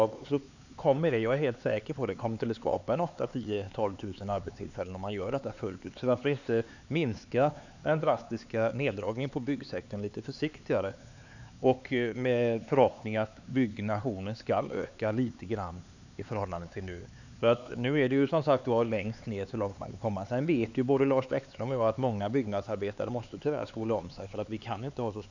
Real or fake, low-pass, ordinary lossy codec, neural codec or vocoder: fake; 7.2 kHz; none; codec, 16 kHz, 4 kbps, X-Codec, HuBERT features, trained on LibriSpeech